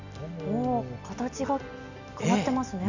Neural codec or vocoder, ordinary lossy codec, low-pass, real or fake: none; none; 7.2 kHz; real